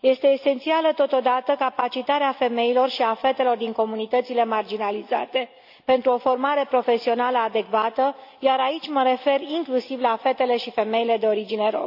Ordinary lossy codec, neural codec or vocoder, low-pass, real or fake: AAC, 48 kbps; none; 5.4 kHz; real